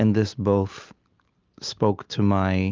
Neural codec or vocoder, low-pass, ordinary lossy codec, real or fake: none; 7.2 kHz; Opus, 24 kbps; real